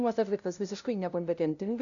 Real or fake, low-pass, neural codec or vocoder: fake; 7.2 kHz; codec, 16 kHz, 0.5 kbps, FunCodec, trained on LibriTTS, 25 frames a second